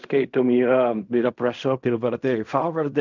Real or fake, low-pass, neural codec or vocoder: fake; 7.2 kHz; codec, 16 kHz in and 24 kHz out, 0.4 kbps, LongCat-Audio-Codec, fine tuned four codebook decoder